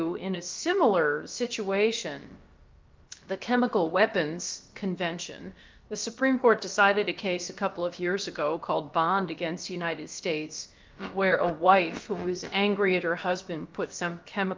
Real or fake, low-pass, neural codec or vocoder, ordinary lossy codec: fake; 7.2 kHz; codec, 16 kHz, about 1 kbps, DyCAST, with the encoder's durations; Opus, 24 kbps